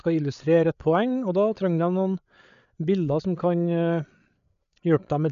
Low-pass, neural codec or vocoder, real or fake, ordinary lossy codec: 7.2 kHz; codec, 16 kHz, 8 kbps, FreqCodec, larger model; fake; none